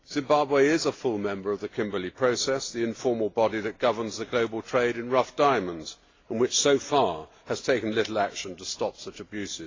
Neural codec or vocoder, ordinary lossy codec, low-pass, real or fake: none; AAC, 32 kbps; 7.2 kHz; real